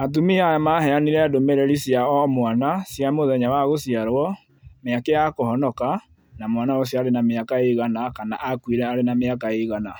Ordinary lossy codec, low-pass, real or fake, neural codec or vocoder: none; none; real; none